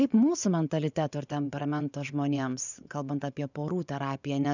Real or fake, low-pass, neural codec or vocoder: fake; 7.2 kHz; vocoder, 24 kHz, 100 mel bands, Vocos